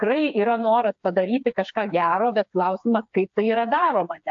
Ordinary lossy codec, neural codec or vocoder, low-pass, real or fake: AAC, 64 kbps; codec, 16 kHz, 4 kbps, FreqCodec, smaller model; 7.2 kHz; fake